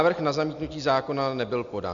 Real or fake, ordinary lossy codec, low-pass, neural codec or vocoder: real; Opus, 64 kbps; 7.2 kHz; none